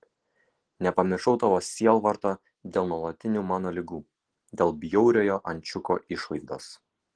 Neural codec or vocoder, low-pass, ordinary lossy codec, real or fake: none; 9.9 kHz; Opus, 16 kbps; real